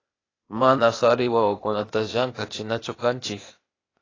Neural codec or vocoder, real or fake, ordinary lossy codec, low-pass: codec, 16 kHz, 0.8 kbps, ZipCodec; fake; AAC, 32 kbps; 7.2 kHz